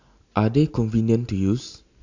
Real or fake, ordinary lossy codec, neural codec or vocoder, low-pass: real; AAC, 48 kbps; none; 7.2 kHz